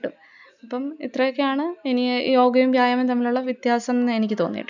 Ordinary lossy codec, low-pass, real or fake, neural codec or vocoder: none; 7.2 kHz; real; none